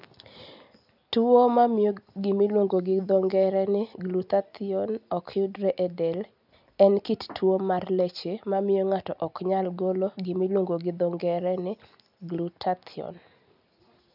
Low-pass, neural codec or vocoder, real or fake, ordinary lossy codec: 5.4 kHz; none; real; none